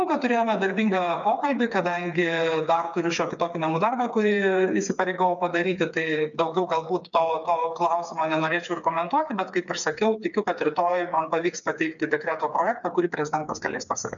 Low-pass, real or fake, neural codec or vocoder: 7.2 kHz; fake; codec, 16 kHz, 4 kbps, FreqCodec, smaller model